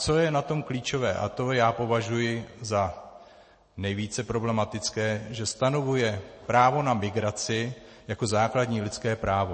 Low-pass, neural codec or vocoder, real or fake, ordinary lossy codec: 10.8 kHz; none; real; MP3, 32 kbps